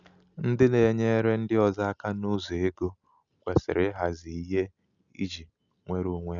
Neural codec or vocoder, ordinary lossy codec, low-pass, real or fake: none; none; 7.2 kHz; real